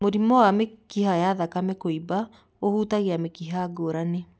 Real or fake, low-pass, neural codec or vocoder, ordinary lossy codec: real; none; none; none